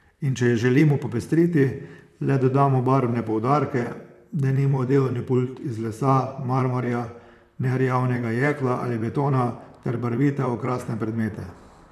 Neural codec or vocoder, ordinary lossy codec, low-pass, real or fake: vocoder, 44.1 kHz, 128 mel bands, Pupu-Vocoder; none; 14.4 kHz; fake